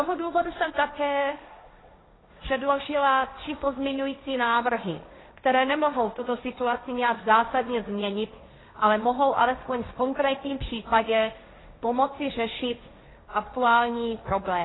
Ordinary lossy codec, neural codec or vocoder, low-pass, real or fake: AAC, 16 kbps; codec, 16 kHz, 1.1 kbps, Voila-Tokenizer; 7.2 kHz; fake